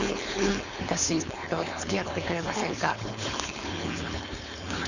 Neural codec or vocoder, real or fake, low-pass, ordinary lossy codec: codec, 16 kHz, 4.8 kbps, FACodec; fake; 7.2 kHz; none